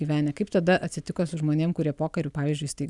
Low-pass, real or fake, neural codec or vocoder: 10.8 kHz; real; none